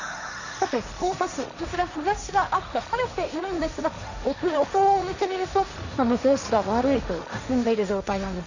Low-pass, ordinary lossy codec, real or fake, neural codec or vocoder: 7.2 kHz; none; fake; codec, 16 kHz, 1.1 kbps, Voila-Tokenizer